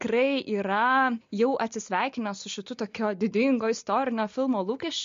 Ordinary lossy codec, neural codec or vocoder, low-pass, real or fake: MP3, 48 kbps; none; 7.2 kHz; real